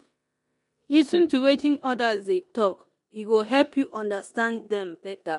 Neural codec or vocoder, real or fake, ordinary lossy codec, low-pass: codec, 16 kHz in and 24 kHz out, 0.9 kbps, LongCat-Audio-Codec, four codebook decoder; fake; MP3, 64 kbps; 10.8 kHz